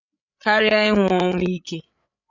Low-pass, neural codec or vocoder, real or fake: 7.2 kHz; codec, 16 kHz, 8 kbps, FreqCodec, larger model; fake